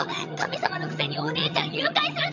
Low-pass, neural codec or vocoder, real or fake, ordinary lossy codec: 7.2 kHz; vocoder, 22.05 kHz, 80 mel bands, HiFi-GAN; fake; none